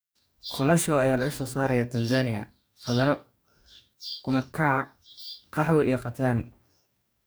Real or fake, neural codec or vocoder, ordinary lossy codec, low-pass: fake; codec, 44.1 kHz, 2.6 kbps, DAC; none; none